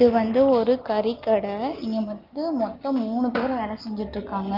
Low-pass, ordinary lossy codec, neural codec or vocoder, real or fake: 5.4 kHz; Opus, 32 kbps; none; real